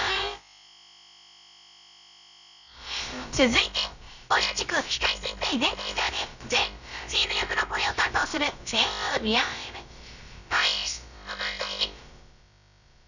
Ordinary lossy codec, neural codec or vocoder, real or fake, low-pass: none; codec, 16 kHz, about 1 kbps, DyCAST, with the encoder's durations; fake; 7.2 kHz